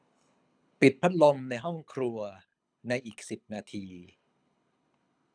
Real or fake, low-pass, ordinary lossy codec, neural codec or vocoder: fake; 9.9 kHz; none; codec, 24 kHz, 6 kbps, HILCodec